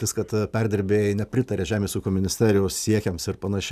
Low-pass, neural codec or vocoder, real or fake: 14.4 kHz; none; real